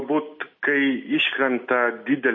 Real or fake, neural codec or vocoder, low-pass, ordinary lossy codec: real; none; 7.2 kHz; MP3, 32 kbps